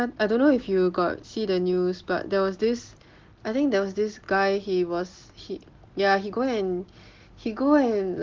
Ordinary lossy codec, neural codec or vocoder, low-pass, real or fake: Opus, 16 kbps; none; 7.2 kHz; real